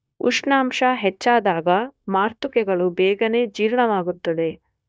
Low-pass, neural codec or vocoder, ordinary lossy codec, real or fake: none; codec, 16 kHz, 0.9 kbps, LongCat-Audio-Codec; none; fake